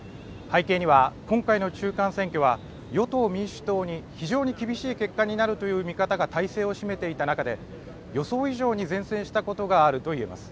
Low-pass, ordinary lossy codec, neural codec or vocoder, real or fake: none; none; none; real